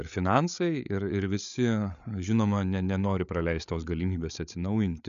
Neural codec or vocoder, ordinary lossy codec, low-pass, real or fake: codec, 16 kHz, 8 kbps, FreqCodec, larger model; MP3, 96 kbps; 7.2 kHz; fake